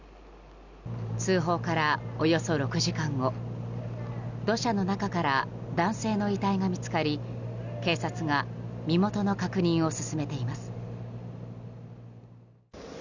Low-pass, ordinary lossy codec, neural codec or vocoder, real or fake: 7.2 kHz; none; none; real